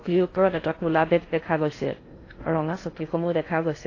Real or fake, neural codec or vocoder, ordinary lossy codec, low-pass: fake; codec, 16 kHz in and 24 kHz out, 0.6 kbps, FocalCodec, streaming, 4096 codes; AAC, 32 kbps; 7.2 kHz